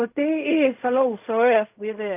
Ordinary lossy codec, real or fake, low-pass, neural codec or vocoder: none; fake; 3.6 kHz; codec, 16 kHz in and 24 kHz out, 0.4 kbps, LongCat-Audio-Codec, fine tuned four codebook decoder